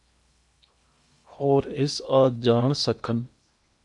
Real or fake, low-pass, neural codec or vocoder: fake; 10.8 kHz; codec, 16 kHz in and 24 kHz out, 0.8 kbps, FocalCodec, streaming, 65536 codes